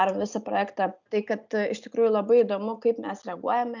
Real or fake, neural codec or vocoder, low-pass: real; none; 7.2 kHz